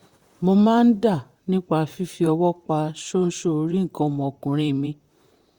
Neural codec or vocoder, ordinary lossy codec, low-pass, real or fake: vocoder, 44.1 kHz, 128 mel bands, Pupu-Vocoder; Opus, 64 kbps; 19.8 kHz; fake